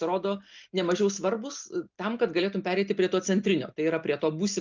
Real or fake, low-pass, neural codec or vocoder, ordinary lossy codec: real; 7.2 kHz; none; Opus, 32 kbps